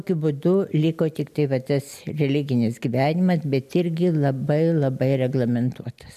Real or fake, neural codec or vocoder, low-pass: real; none; 14.4 kHz